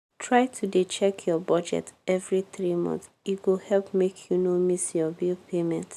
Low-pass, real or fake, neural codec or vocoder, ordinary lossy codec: 14.4 kHz; real; none; none